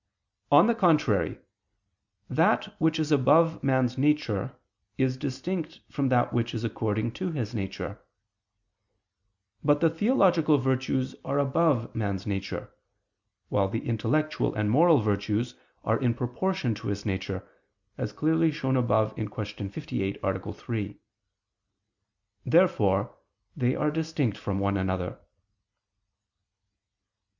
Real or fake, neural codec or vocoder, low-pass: real; none; 7.2 kHz